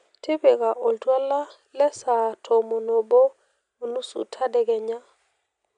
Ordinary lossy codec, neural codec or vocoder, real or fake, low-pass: none; none; real; 9.9 kHz